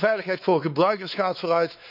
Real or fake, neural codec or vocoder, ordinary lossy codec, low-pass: fake; codec, 16 kHz, 4 kbps, X-Codec, WavLM features, trained on Multilingual LibriSpeech; none; 5.4 kHz